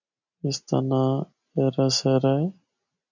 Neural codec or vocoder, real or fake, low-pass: none; real; 7.2 kHz